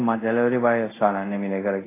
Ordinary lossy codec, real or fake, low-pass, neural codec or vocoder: AAC, 16 kbps; fake; 3.6 kHz; codec, 24 kHz, 0.5 kbps, DualCodec